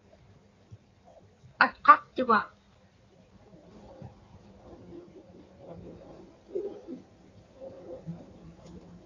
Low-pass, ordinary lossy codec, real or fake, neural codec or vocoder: 7.2 kHz; AAC, 32 kbps; fake; codec, 16 kHz in and 24 kHz out, 1.1 kbps, FireRedTTS-2 codec